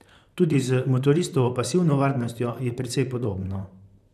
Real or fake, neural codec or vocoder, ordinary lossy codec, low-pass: fake; vocoder, 44.1 kHz, 128 mel bands, Pupu-Vocoder; none; 14.4 kHz